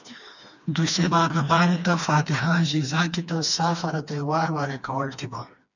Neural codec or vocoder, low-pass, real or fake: codec, 16 kHz, 2 kbps, FreqCodec, smaller model; 7.2 kHz; fake